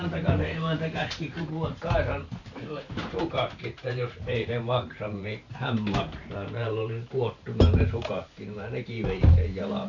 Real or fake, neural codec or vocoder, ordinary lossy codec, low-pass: fake; vocoder, 44.1 kHz, 128 mel bands every 512 samples, BigVGAN v2; none; 7.2 kHz